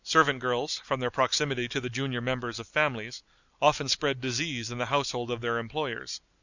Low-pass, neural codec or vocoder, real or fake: 7.2 kHz; none; real